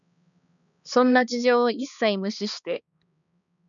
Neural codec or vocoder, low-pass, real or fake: codec, 16 kHz, 2 kbps, X-Codec, HuBERT features, trained on balanced general audio; 7.2 kHz; fake